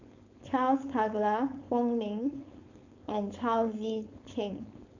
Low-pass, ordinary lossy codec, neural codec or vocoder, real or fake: 7.2 kHz; none; codec, 16 kHz, 4.8 kbps, FACodec; fake